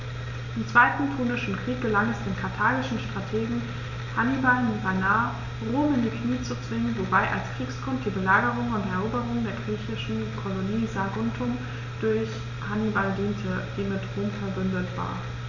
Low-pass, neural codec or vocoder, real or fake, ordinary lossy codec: 7.2 kHz; none; real; none